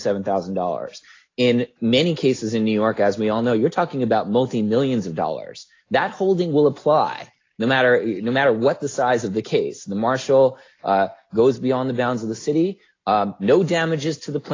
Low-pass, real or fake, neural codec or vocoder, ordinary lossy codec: 7.2 kHz; real; none; AAC, 32 kbps